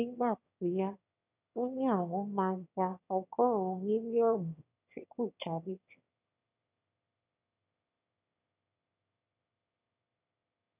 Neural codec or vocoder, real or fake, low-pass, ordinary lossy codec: autoencoder, 22.05 kHz, a latent of 192 numbers a frame, VITS, trained on one speaker; fake; 3.6 kHz; none